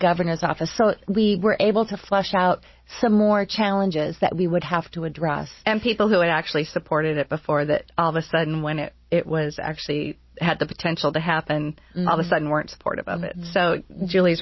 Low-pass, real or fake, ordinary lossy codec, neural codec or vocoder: 7.2 kHz; real; MP3, 24 kbps; none